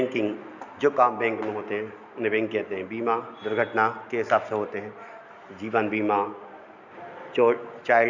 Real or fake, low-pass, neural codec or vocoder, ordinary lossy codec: real; 7.2 kHz; none; none